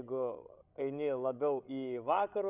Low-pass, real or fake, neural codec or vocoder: 3.6 kHz; fake; codec, 16 kHz, 16 kbps, FreqCodec, larger model